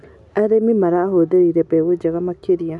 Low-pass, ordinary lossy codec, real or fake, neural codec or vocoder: 10.8 kHz; none; real; none